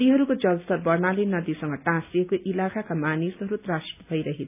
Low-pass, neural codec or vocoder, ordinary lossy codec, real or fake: 3.6 kHz; none; none; real